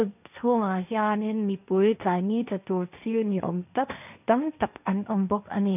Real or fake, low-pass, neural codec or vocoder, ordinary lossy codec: fake; 3.6 kHz; codec, 16 kHz, 1.1 kbps, Voila-Tokenizer; none